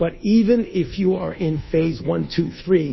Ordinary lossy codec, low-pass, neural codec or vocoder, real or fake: MP3, 24 kbps; 7.2 kHz; codec, 24 kHz, 1.2 kbps, DualCodec; fake